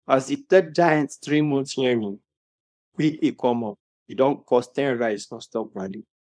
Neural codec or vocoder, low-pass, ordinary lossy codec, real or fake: codec, 24 kHz, 0.9 kbps, WavTokenizer, small release; 9.9 kHz; none; fake